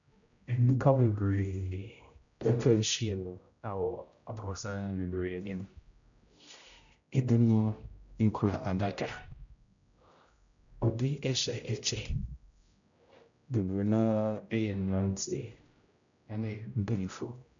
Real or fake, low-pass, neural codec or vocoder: fake; 7.2 kHz; codec, 16 kHz, 0.5 kbps, X-Codec, HuBERT features, trained on general audio